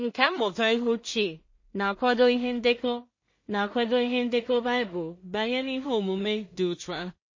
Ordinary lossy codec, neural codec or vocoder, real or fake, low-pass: MP3, 32 kbps; codec, 16 kHz in and 24 kHz out, 0.4 kbps, LongCat-Audio-Codec, two codebook decoder; fake; 7.2 kHz